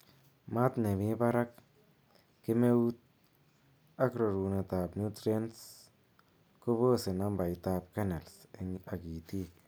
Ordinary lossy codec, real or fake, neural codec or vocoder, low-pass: none; real; none; none